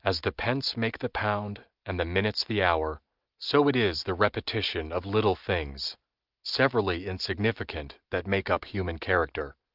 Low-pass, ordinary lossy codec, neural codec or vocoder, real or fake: 5.4 kHz; Opus, 32 kbps; none; real